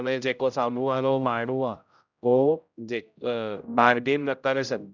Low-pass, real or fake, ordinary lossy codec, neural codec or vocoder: 7.2 kHz; fake; none; codec, 16 kHz, 0.5 kbps, X-Codec, HuBERT features, trained on general audio